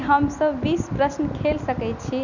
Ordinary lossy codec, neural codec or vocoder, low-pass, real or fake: none; none; 7.2 kHz; real